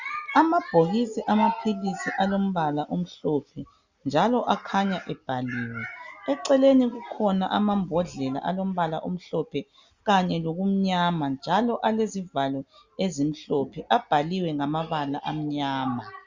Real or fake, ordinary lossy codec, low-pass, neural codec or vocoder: real; Opus, 64 kbps; 7.2 kHz; none